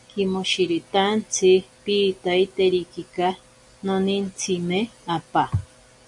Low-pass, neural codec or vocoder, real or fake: 10.8 kHz; none; real